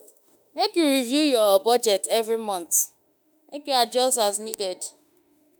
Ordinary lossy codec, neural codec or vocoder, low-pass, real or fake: none; autoencoder, 48 kHz, 32 numbers a frame, DAC-VAE, trained on Japanese speech; none; fake